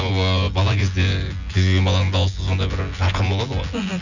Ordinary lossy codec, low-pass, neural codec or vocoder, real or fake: none; 7.2 kHz; vocoder, 24 kHz, 100 mel bands, Vocos; fake